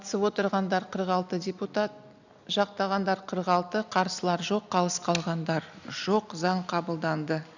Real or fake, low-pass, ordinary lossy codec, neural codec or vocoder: real; 7.2 kHz; none; none